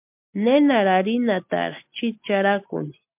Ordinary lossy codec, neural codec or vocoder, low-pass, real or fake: MP3, 32 kbps; none; 3.6 kHz; real